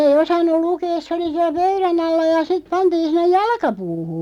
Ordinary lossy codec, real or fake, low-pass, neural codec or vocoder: none; real; 19.8 kHz; none